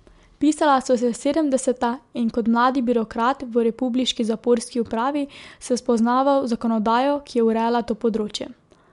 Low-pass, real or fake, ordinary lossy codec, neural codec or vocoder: 10.8 kHz; real; MP3, 64 kbps; none